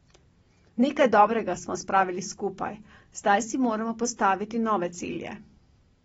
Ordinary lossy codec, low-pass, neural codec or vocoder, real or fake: AAC, 24 kbps; 10.8 kHz; none; real